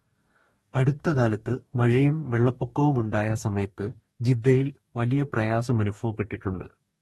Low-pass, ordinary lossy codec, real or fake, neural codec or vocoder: 19.8 kHz; AAC, 32 kbps; fake; codec, 44.1 kHz, 2.6 kbps, DAC